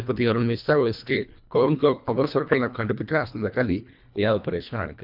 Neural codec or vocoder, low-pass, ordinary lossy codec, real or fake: codec, 24 kHz, 1.5 kbps, HILCodec; 5.4 kHz; none; fake